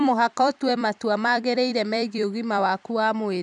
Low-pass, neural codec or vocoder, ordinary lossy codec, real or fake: 10.8 kHz; vocoder, 44.1 kHz, 128 mel bands every 256 samples, BigVGAN v2; none; fake